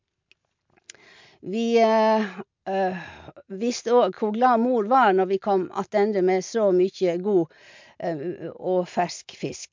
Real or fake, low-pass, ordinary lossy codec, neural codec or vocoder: real; 7.2 kHz; MP3, 64 kbps; none